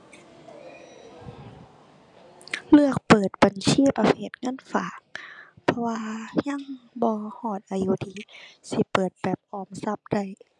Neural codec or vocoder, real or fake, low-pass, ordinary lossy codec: none; real; 10.8 kHz; none